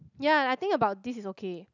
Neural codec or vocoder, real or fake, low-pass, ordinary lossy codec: none; real; 7.2 kHz; none